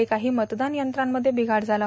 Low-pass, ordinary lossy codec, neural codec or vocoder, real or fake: none; none; none; real